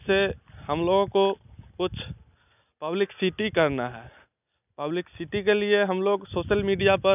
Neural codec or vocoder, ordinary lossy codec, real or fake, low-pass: none; none; real; 3.6 kHz